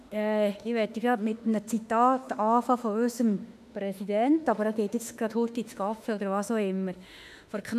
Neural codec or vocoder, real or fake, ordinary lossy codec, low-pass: autoencoder, 48 kHz, 32 numbers a frame, DAC-VAE, trained on Japanese speech; fake; none; 14.4 kHz